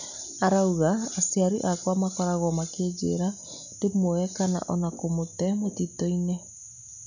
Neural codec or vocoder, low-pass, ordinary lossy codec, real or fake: none; 7.2 kHz; none; real